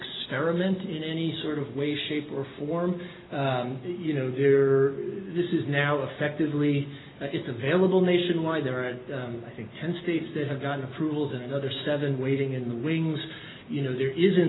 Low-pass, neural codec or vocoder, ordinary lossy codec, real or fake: 7.2 kHz; none; AAC, 16 kbps; real